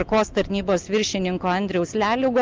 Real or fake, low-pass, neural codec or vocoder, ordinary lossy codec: real; 7.2 kHz; none; Opus, 32 kbps